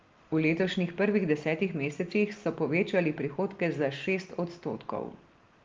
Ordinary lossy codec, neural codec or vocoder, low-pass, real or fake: Opus, 32 kbps; none; 7.2 kHz; real